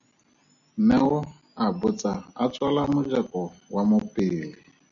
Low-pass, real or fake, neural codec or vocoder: 7.2 kHz; real; none